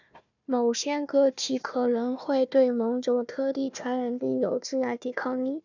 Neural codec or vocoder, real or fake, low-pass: codec, 16 kHz, 1 kbps, FunCodec, trained on Chinese and English, 50 frames a second; fake; 7.2 kHz